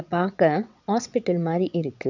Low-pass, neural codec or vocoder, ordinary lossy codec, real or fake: 7.2 kHz; vocoder, 22.05 kHz, 80 mel bands, WaveNeXt; none; fake